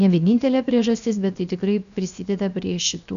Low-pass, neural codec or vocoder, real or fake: 7.2 kHz; codec, 16 kHz, 0.7 kbps, FocalCodec; fake